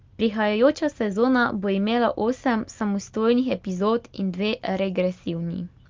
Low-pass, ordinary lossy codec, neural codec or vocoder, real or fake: 7.2 kHz; Opus, 24 kbps; none; real